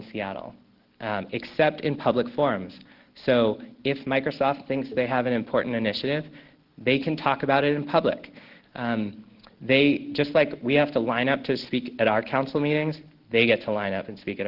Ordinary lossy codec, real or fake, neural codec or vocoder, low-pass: Opus, 16 kbps; real; none; 5.4 kHz